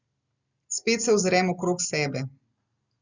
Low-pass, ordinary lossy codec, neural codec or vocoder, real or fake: 7.2 kHz; Opus, 64 kbps; none; real